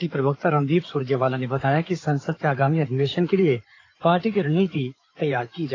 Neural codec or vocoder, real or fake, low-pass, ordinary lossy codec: codec, 16 kHz in and 24 kHz out, 2.2 kbps, FireRedTTS-2 codec; fake; 7.2 kHz; AAC, 32 kbps